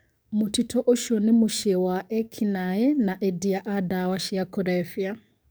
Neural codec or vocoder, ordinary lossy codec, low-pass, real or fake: codec, 44.1 kHz, 7.8 kbps, DAC; none; none; fake